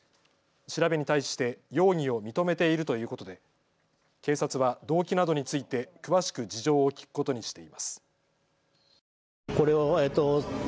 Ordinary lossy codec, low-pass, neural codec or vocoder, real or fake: none; none; none; real